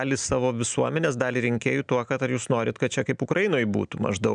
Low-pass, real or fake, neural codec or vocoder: 9.9 kHz; real; none